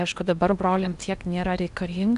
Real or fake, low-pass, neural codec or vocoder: fake; 10.8 kHz; codec, 16 kHz in and 24 kHz out, 0.8 kbps, FocalCodec, streaming, 65536 codes